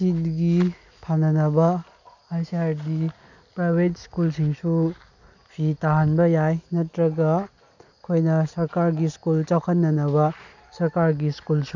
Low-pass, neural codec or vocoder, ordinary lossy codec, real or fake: 7.2 kHz; none; none; real